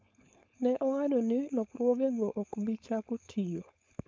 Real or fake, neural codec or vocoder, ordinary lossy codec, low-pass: fake; codec, 16 kHz, 4.8 kbps, FACodec; none; none